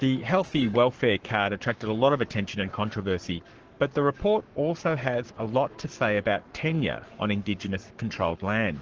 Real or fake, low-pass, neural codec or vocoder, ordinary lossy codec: fake; 7.2 kHz; codec, 44.1 kHz, 7.8 kbps, Pupu-Codec; Opus, 16 kbps